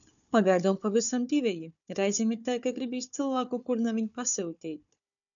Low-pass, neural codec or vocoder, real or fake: 7.2 kHz; codec, 16 kHz, 4 kbps, FunCodec, trained on Chinese and English, 50 frames a second; fake